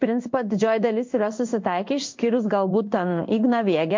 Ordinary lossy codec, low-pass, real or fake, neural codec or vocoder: MP3, 48 kbps; 7.2 kHz; fake; codec, 16 kHz in and 24 kHz out, 1 kbps, XY-Tokenizer